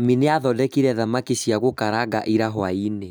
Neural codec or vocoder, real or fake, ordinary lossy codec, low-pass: none; real; none; none